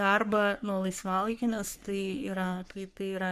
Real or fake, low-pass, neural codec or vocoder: fake; 14.4 kHz; codec, 44.1 kHz, 3.4 kbps, Pupu-Codec